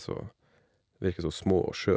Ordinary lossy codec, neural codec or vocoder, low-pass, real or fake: none; none; none; real